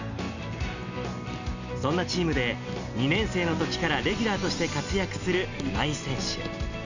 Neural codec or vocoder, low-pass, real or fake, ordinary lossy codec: none; 7.2 kHz; real; none